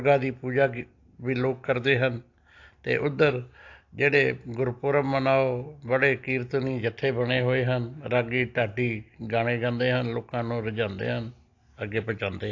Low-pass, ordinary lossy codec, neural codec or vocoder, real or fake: 7.2 kHz; AAC, 48 kbps; none; real